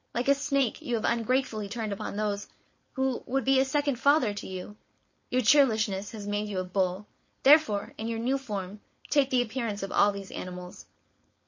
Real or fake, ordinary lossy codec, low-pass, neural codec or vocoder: fake; MP3, 32 kbps; 7.2 kHz; codec, 16 kHz, 4.8 kbps, FACodec